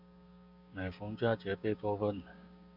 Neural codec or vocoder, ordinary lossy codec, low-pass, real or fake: codec, 16 kHz, 6 kbps, DAC; Opus, 64 kbps; 5.4 kHz; fake